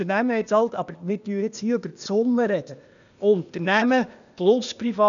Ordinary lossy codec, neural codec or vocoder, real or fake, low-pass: none; codec, 16 kHz, 0.8 kbps, ZipCodec; fake; 7.2 kHz